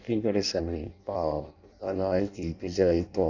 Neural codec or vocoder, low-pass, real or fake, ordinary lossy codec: codec, 16 kHz in and 24 kHz out, 0.6 kbps, FireRedTTS-2 codec; 7.2 kHz; fake; none